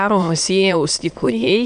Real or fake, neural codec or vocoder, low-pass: fake; autoencoder, 22.05 kHz, a latent of 192 numbers a frame, VITS, trained on many speakers; 9.9 kHz